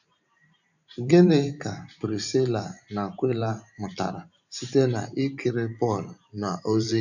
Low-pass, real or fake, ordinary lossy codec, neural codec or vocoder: 7.2 kHz; fake; none; vocoder, 44.1 kHz, 128 mel bands every 512 samples, BigVGAN v2